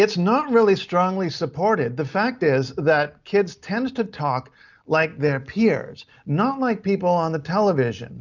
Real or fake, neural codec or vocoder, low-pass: real; none; 7.2 kHz